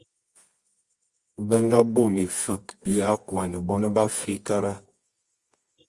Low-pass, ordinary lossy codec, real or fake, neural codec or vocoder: 10.8 kHz; Opus, 32 kbps; fake; codec, 24 kHz, 0.9 kbps, WavTokenizer, medium music audio release